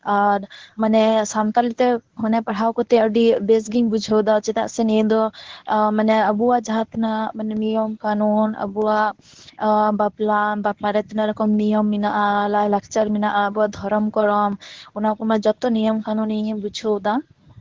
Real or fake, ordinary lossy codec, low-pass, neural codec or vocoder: fake; Opus, 16 kbps; 7.2 kHz; codec, 24 kHz, 0.9 kbps, WavTokenizer, medium speech release version 1